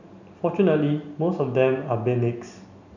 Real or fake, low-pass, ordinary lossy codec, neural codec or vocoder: real; 7.2 kHz; none; none